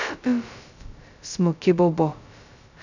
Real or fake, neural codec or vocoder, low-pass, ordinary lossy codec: fake; codec, 16 kHz, 0.2 kbps, FocalCodec; 7.2 kHz; none